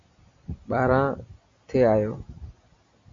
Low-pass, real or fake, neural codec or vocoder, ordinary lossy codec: 7.2 kHz; real; none; AAC, 48 kbps